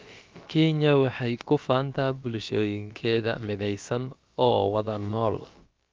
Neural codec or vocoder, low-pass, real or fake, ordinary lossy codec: codec, 16 kHz, about 1 kbps, DyCAST, with the encoder's durations; 7.2 kHz; fake; Opus, 24 kbps